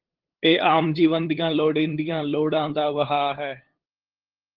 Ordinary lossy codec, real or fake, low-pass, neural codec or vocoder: Opus, 16 kbps; fake; 5.4 kHz; codec, 16 kHz, 8 kbps, FunCodec, trained on LibriTTS, 25 frames a second